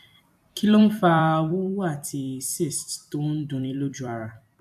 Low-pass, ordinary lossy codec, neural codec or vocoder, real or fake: 14.4 kHz; AAC, 96 kbps; vocoder, 44.1 kHz, 128 mel bands every 256 samples, BigVGAN v2; fake